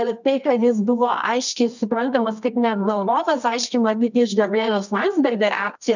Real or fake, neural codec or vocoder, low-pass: fake; codec, 24 kHz, 0.9 kbps, WavTokenizer, medium music audio release; 7.2 kHz